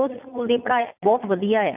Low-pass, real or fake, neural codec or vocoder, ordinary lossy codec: 3.6 kHz; fake; vocoder, 44.1 kHz, 80 mel bands, Vocos; none